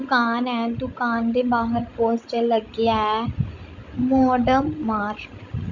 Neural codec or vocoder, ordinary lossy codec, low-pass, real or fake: codec, 16 kHz, 16 kbps, FreqCodec, larger model; Opus, 64 kbps; 7.2 kHz; fake